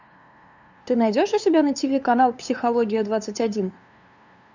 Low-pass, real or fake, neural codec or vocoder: 7.2 kHz; fake; codec, 16 kHz, 2 kbps, FunCodec, trained on LibriTTS, 25 frames a second